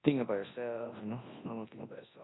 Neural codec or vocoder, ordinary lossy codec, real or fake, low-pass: codec, 24 kHz, 0.9 kbps, WavTokenizer, large speech release; AAC, 16 kbps; fake; 7.2 kHz